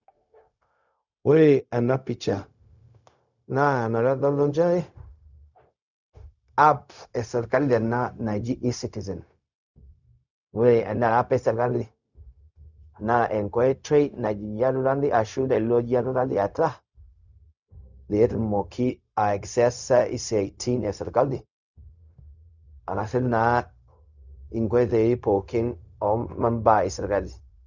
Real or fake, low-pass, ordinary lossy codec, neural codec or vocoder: fake; 7.2 kHz; none; codec, 16 kHz, 0.4 kbps, LongCat-Audio-Codec